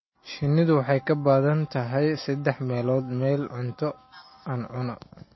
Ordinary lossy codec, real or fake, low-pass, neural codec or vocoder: MP3, 24 kbps; real; 7.2 kHz; none